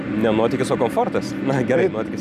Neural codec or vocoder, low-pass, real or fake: none; 14.4 kHz; real